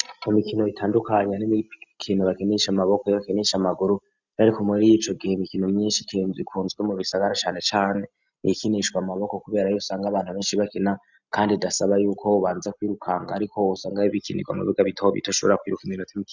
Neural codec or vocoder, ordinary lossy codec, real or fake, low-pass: none; Opus, 64 kbps; real; 7.2 kHz